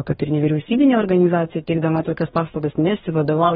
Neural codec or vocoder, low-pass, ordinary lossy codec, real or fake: codec, 44.1 kHz, 2.6 kbps, DAC; 19.8 kHz; AAC, 16 kbps; fake